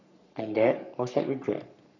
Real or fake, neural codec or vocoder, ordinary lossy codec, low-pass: fake; codec, 44.1 kHz, 3.4 kbps, Pupu-Codec; none; 7.2 kHz